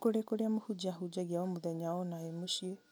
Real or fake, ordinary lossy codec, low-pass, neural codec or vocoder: real; none; none; none